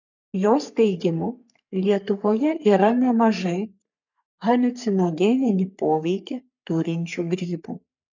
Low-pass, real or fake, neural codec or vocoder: 7.2 kHz; fake; codec, 44.1 kHz, 3.4 kbps, Pupu-Codec